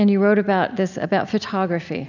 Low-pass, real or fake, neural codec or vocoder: 7.2 kHz; real; none